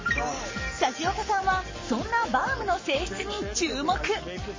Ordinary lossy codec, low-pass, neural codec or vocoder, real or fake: MP3, 32 kbps; 7.2 kHz; codec, 44.1 kHz, 7.8 kbps, Pupu-Codec; fake